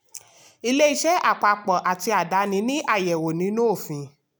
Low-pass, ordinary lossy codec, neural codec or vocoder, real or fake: none; none; none; real